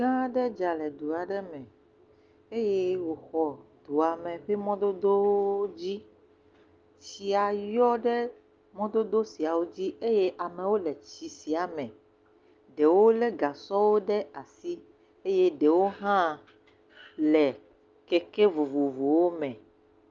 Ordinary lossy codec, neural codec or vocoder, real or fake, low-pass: Opus, 24 kbps; none; real; 7.2 kHz